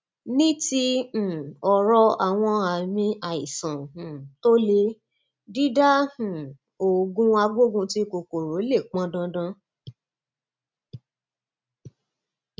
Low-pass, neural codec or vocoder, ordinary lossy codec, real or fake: none; none; none; real